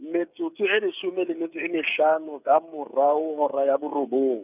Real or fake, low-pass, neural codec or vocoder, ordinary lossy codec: fake; 3.6 kHz; codec, 16 kHz, 8 kbps, FreqCodec, smaller model; none